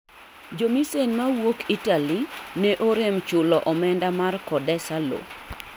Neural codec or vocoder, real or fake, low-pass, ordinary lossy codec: none; real; none; none